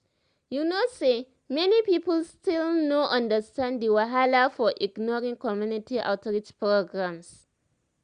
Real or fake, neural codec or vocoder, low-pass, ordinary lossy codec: real; none; 9.9 kHz; Opus, 64 kbps